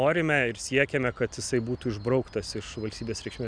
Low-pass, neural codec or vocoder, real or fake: 9.9 kHz; none; real